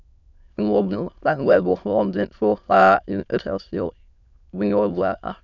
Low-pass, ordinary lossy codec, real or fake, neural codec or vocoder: 7.2 kHz; none; fake; autoencoder, 22.05 kHz, a latent of 192 numbers a frame, VITS, trained on many speakers